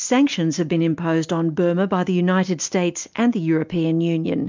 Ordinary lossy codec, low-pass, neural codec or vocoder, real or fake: MP3, 64 kbps; 7.2 kHz; none; real